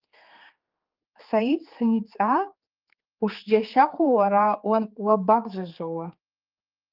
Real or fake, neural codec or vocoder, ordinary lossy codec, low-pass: fake; codec, 16 kHz, 4 kbps, X-Codec, HuBERT features, trained on general audio; Opus, 32 kbps; 5.4 kHz